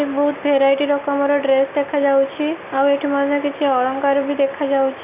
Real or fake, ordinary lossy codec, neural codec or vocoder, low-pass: real; none; none; 3.6 kHz